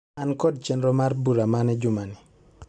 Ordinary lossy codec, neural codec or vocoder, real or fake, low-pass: none; none; real; 9.9 kHz